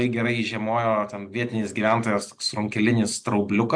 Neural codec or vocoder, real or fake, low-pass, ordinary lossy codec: none; real; 9.9 kHz; MP3, 64 kbps